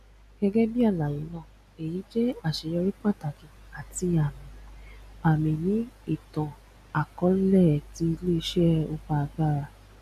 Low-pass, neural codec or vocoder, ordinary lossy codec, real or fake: 14.4 kHz; none; none; real